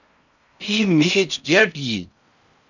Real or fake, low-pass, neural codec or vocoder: fake; 7.2 kHz; codec, 16 kHz in and 24 kHz out, 0.6 kbps, FocalCodec, streaming, 4096 codes